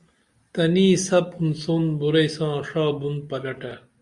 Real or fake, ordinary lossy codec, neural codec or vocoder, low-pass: real; Opus, 64 kbps; none; 10.8 kHz